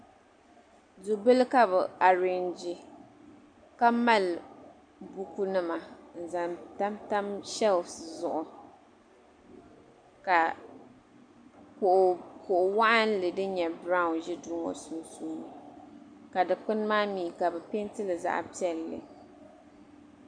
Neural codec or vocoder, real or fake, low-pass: none; real; 9.9 kHz